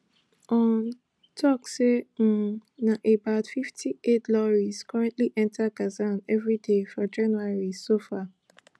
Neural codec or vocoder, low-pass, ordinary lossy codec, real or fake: none; none; none; real